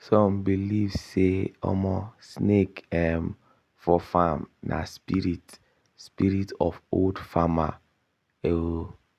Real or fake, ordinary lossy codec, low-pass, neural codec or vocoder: real; none; 14.4 kHz; none